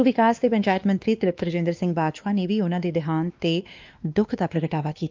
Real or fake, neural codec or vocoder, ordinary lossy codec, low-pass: fake; codec, 16 kHz, 2 kbps, X-Codec, WavLM features, trained on Multilingual LibriSpeech; Opus, 24 kbps; 7.2 kHz